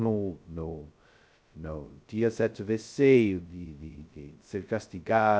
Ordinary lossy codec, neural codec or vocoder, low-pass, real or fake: none; codec, 16 kHz, 0.2 kbps, FocalCodec; none; fake